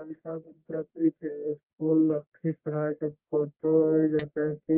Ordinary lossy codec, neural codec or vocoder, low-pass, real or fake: Opus, 24 kbps; codec, 44.1 kHz, 1.7 kbps, Pupu-Codec; 3.6 kHz; fake